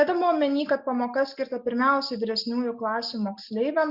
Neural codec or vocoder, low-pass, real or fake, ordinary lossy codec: none; 5.4 kHz; real; Opus, 64 kbps